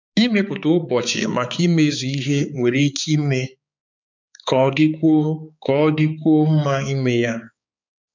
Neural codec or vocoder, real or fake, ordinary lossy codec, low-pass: codec, 16 kHz, 4 kbps, X-Codec, HuBERT features, trained on balanced general audio; fake; MP3, 64 kbps; 7.2 kHz